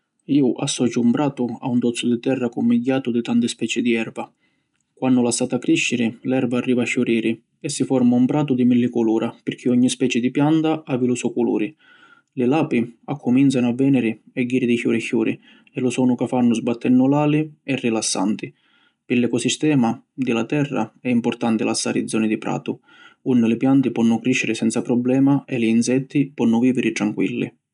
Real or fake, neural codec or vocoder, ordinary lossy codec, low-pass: real; none; none; 10.8 kHz